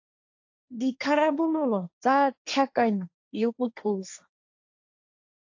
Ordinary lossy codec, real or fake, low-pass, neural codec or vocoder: AAC, 48 kbps; fake; 7.2 kHz; codec, 16 kHz, 1.1 kbps, Voila-Tokenizer